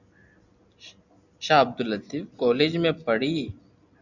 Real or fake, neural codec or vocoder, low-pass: real; none; 7.2 kHz